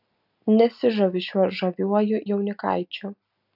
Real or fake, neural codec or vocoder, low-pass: real; none; 5.4 kHz